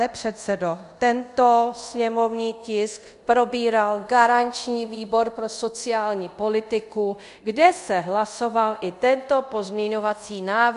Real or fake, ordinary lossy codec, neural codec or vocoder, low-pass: fake; MP3, 64 kbps; codec, 24 kHz, 0.5 kbps, DualCodec; 10.8 kHz